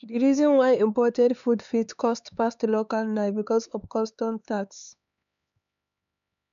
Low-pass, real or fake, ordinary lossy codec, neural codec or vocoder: 7.2 kHz; fake; none; codec, 16 kHz, 4 kbps, X-Codec, HuBERT features, trained on LibriSpeech